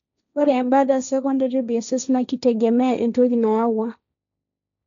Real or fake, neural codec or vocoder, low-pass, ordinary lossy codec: fake; codec, 16 kHz, 1.1 kbps, Voila-Tokenizer; 7.2 kHz; none